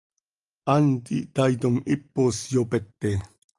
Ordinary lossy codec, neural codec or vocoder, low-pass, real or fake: Opus, 32 kbps; none; 10.8 kHz; real